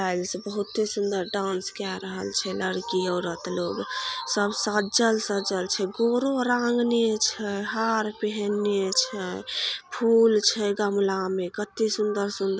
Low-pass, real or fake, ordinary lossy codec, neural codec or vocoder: none; real; none; none